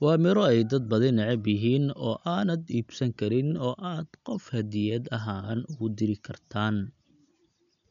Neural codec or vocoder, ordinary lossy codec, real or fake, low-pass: none; none; real; 7.2 kHz